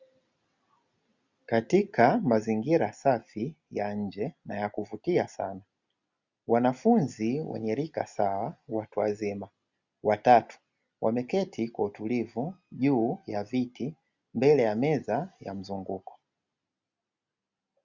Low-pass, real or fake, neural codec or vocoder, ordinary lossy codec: 7.2 kHz; real; none; Opus, 64 kbps